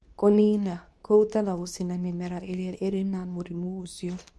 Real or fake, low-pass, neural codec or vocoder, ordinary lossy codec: fake; none; codec, 24 kHz, 0.9 kbps, WavTokenizer, medium speech release version 2; none